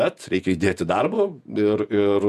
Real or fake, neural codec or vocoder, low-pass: fake; vocoder, 48 kHz, 128 mel bands, Vocos; 14.4 kHz